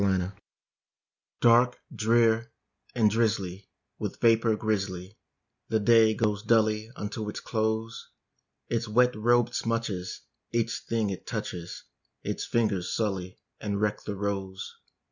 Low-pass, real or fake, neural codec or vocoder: 7.2 kHz; real; none